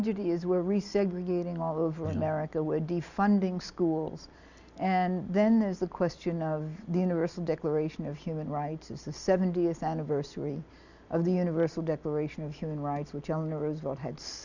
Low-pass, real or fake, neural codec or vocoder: 7.2 kHz; real; none